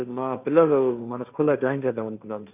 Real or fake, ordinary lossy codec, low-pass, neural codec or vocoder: fake; none; 3.6 kHz; codec, 16 kHz, 1.1 kbps, Voila-Tokenizer